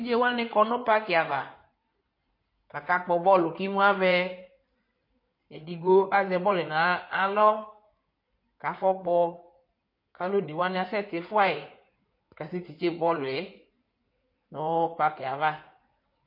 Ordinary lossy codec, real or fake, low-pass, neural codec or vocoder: MP3, 32 kbps; fake; 5.4 kHz; codec, 16 kHz in and 24 kHz out, 2.2 kbps, FireRedTTS-2 codec